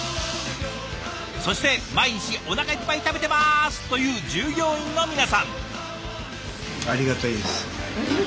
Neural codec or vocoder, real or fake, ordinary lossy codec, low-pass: none; real; none; none